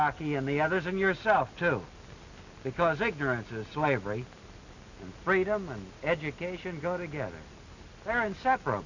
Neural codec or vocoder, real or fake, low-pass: none; real; 7.2 kHz